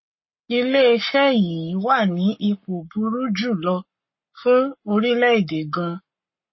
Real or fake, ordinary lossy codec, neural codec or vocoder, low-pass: fake; MP3, 24 kbps; codec, 44.1 kHz, 7.8 kbps, Pupu-Codec; 7.2 kHz